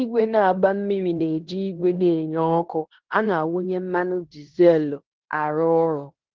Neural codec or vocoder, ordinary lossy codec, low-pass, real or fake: codec, 16 kHz in and 24 kHz out, 0.9 kbps, LongCat-Audio-Codec, fine tuned four codebook decoder; Opus, 16 kbps; 7.2 kHz; fake